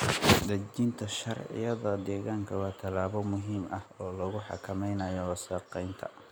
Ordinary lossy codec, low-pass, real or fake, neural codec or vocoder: none; none; real; none